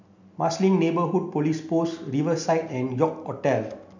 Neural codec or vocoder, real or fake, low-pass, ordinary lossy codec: none; real; 7.2 kHz; none